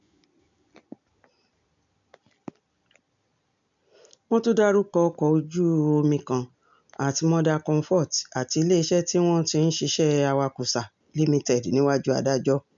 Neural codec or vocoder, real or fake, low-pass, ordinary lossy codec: none; real; 7.2 kHz; none